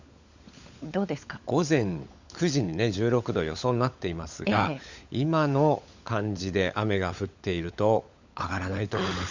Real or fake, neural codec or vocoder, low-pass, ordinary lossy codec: fake; codec, 16 kHz, 16 kbps, FunCodec, trained on LibriTTS, 50 frames a second; 7.2 kHz; Opus, 64 kbps